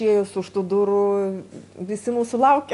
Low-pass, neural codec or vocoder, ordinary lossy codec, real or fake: 10.8 kHz; none; MP3, 96 kbps; real